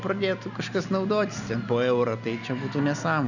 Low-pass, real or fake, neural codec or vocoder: 7.2 kHz; real; none